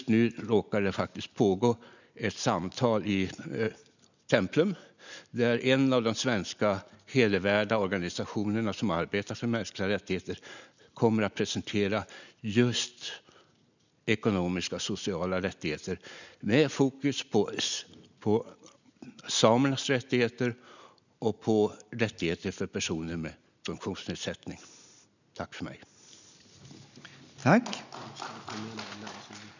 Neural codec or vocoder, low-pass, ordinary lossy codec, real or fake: none; 7.2 kHz; none; real